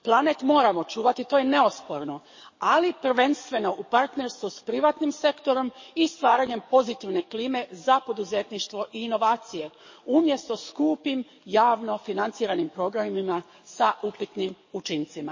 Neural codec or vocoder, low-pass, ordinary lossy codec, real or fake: codec, 44.1 kHz, 7.8 kbps, DAC; 7.2 kHz; MP3, 32 kbps; fake